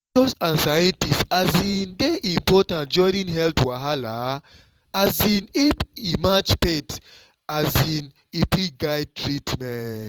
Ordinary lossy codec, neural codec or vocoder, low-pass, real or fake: Opus, 24 kbps; vocoder, 44.1 kHz, 128 mel bands every 512 samples, BigVGAN v2; 19.8 kHz; fake